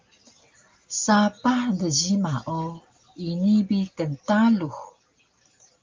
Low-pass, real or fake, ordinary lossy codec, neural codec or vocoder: 7.2 kHz; real; Opus, 24 kbps; none